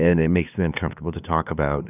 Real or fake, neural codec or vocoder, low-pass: fake; codec, 16 kHz, 2 kbps, FunCodec, trained on LibriTTS, 25 frames a second; 3.6 kHz